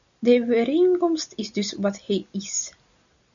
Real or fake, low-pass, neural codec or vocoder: real; 7.2 kHz; none